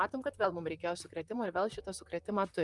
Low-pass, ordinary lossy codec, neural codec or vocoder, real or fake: 10.8 kHz; Opus, 32 kbps; vocoder, 44.1 kHz, 128 mel bands, Pupu-Vocoder; fake